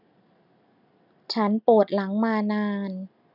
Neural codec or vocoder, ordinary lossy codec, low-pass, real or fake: none; none; 5.4 kHz; real